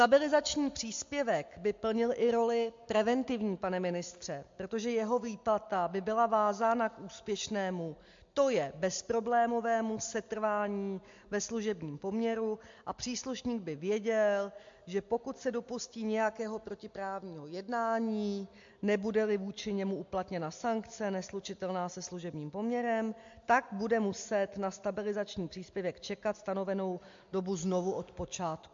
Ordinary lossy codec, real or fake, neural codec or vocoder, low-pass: MP3, 48 kbps; real; none; 7.2 kHz